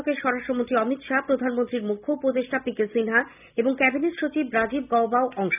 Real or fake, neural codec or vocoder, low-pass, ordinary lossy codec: real; none; 3.6 kHz; none